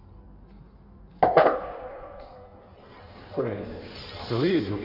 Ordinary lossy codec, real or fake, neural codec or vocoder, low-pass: none; fake; codec, 16 kHz in and 24 kHz out, 1.1 kbps, FireRedTTS-2 codec; 5.4 kHz